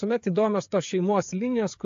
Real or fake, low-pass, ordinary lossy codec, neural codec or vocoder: fake; 7.2 kHz; AAC, 48 kbps; codec, 16 kHz, 8 kbps, FreqCodec, smaller model